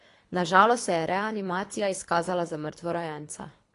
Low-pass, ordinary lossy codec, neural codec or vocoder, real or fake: 10.8 kHz; AAC, 48 kbps; codec, 24 kHz, 3 kbps, HILCodec; fake